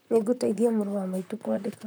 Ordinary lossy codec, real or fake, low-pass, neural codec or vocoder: none; fake; none; vocoder, 44.1 kHz, 128 mel bands, Pupu-Vocoder